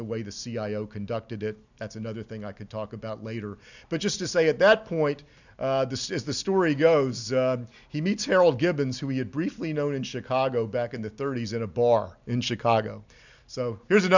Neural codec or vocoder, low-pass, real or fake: none; 7.2 kHz; real